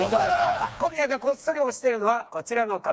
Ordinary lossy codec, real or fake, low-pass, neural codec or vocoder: none; fake; none; codec, 16 kHz, 2 kbps, FreqCodec, smaller model